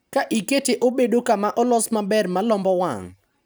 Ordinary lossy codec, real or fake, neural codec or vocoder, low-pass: none; real; none; none